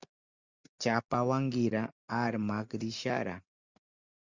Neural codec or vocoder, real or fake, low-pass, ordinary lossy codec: none; real; 7.2 kHz; Opus, 64 kbps